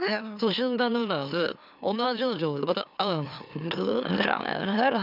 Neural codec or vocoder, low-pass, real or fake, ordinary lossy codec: autoencoder, 44.1 kHz, a latent of 192 numbers a frame, MeloTTS; 5.4 kHz; fake; none